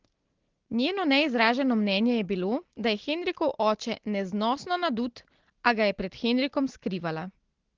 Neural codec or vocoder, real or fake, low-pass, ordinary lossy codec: none; real; 7.2 kHz; Opus, 16 kbps